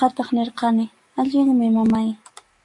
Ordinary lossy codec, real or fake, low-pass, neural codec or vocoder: MP3, 48 kbps; fake; 10.8 kHz; codec, 44.1 kHz, 7.8 kbps, DAC